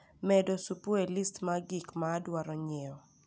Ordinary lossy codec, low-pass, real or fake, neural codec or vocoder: none; none; real; none